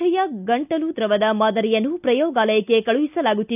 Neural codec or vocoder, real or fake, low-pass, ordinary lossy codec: none; real; 3.6 kHz; none